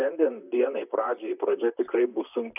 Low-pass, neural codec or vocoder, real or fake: 3.6 kHz; vocoder, 44.1 kHz, 128 mel bands, Pupu-Vocoder; fake